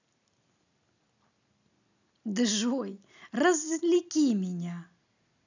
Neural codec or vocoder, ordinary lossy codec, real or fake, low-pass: none; none; real; 7.2 kHz